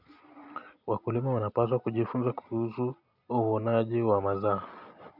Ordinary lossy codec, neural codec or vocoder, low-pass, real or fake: none; none; 5.4 kHz; real